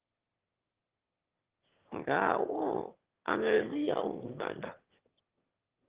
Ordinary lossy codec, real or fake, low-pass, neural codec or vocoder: Opus, 16 kbps; fake; 3.6 kHz; autoencoder, 22.05 kHz, a latent of 192 numbers a frame, VITS, trained on one speaker